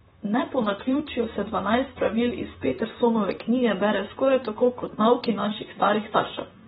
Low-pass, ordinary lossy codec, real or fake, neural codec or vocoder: 19.8 kHz; AAC, 16 kbps; fake; vocoder, 44.1 kHz, 128 mel bands, Pupu-Vocoder